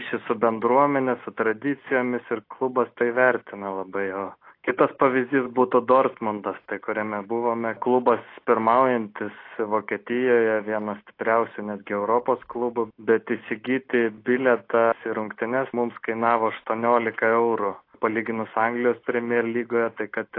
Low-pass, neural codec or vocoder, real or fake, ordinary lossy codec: 5.4 kHz; none; real; AAC, 32 kbps